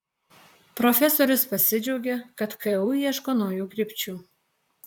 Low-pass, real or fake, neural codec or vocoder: 19.8 kHz; fake; vocoder, 44.1 kHz, 128 mel bands, Pupu-Vocoder